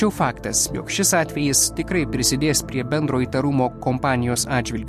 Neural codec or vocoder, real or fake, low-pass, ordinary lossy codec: none; real; 14.4 kHz; MP3, 64 kbps